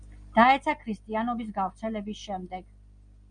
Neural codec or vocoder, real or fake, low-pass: none; real; 9.9 kHz